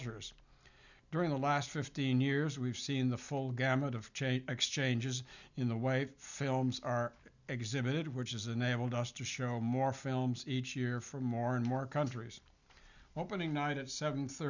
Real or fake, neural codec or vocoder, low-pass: real; none; 7.2 kHz